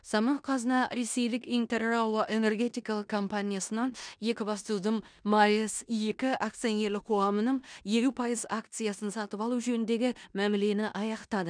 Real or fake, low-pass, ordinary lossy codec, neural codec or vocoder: fake; 9.9 kHz; none; codec, 16 kHz in and 24 kHz out, 0.9 kbps, LongCat-Audio-Codec, four codebook decoder